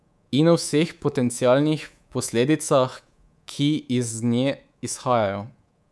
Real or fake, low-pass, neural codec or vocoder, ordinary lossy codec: fake; none; codec, 24 kHz, 3.1 kbps, DualCodec; none